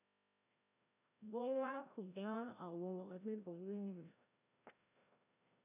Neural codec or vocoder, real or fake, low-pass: codec, 16 kHz, 0.5 kbps, FreqCodec, larger model; fake; 3.6 kHz